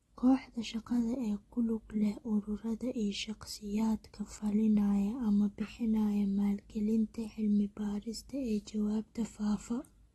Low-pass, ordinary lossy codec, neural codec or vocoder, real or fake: 19.8 kHz; AAC, 32 kbps; none; real